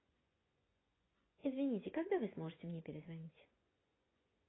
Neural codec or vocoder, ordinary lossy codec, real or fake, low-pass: vocoder, 24 kHz, 100 mel bands, Vocos; AAC, 16 kbps; fake; 7.2 kHz